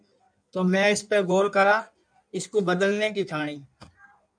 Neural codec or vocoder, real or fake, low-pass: codec, 16 kHz in and 24 kHz out, 1.1 kbps, FireRedTTS-2 codec; fake; 9.9 kHz